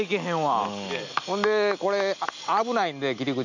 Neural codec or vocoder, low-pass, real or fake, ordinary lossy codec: none; 7.2 kHz; real; none